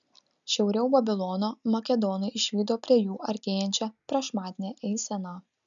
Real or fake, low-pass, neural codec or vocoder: real; 7.2 kHz; none